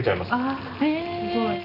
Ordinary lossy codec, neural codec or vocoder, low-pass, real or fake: none; none; 5.4 kHz; real